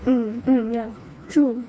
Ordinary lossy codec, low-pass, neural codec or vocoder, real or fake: none; none; codec, 16 kHz, 2 kbps, FreqCodec, smaller model; fake